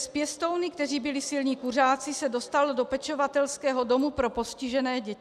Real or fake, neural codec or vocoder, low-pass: real; none; 14.4 kHz